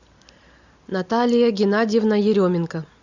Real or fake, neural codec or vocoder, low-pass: real; none; 7.2 kHz